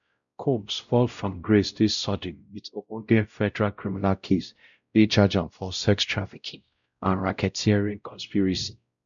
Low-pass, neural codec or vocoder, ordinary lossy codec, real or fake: 7.2 kHz; codec, 16 kHz, 0.5 kbps, X-Codec, WavLM features, trained on Multilingual LibriSpeech; none; fake